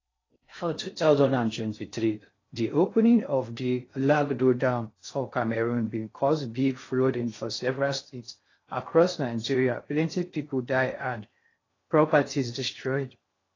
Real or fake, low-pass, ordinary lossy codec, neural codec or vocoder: fake; 7.2 kHz; AAC, 32 kbps; codec, 16 kHz in and 24 kHz out, 0.6 kbps, FocalCodec, streaming, 4096 codes